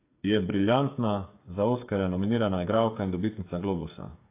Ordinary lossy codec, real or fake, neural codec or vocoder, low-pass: AAC, 24 kbps; fake; codec, 16 kHz, 8 kbps, FreqCodec, smaller model; 3.6 kHz